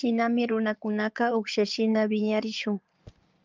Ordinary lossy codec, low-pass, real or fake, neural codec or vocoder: Opus, 24 kbps; 7.2 kHz; fake; codec, 16 kHz in and 24 kHz out, 2.2 kbps, FireRedTTS-2 codec